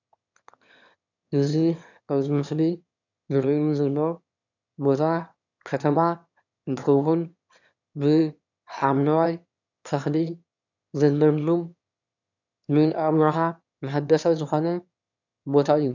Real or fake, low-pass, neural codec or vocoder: fake; 7.2 kHz; autoencoder, 22.05 kHz, a latent of 192 numbers a frame, VITS, trained on one speaker